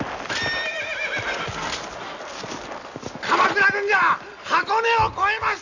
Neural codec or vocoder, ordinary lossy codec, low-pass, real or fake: none; none; 7.2 kHz; real